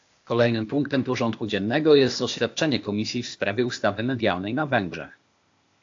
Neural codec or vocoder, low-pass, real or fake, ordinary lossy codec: codec, 16 kHz, 0.8 kbps, ZipCodec; 7.2 kHz; fake; AAC, 48 kbps